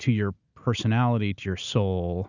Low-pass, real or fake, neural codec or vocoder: 7.2 kHz; real; none